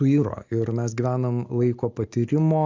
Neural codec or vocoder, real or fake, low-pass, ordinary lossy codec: none; real; 7.2 kHz; MP3, 64 kbps